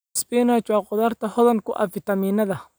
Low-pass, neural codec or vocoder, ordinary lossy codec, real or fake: none; none; none; real